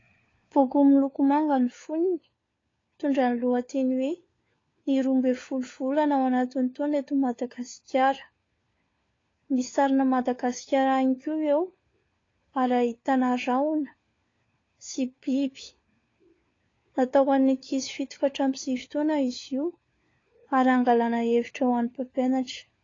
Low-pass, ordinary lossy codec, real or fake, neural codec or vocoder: 7.2 kHz; AAC, 32 kbps; fake; codec, 16 kHz, 4 kbps, FunCodec, trained on LibriTTS, 50 frames a second